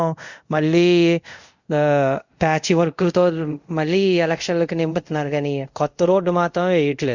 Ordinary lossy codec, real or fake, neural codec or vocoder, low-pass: Opus, 64 kbps; fake; codec, 24 kHz, 0.5 kbps, DualCodec; 7.2 kHz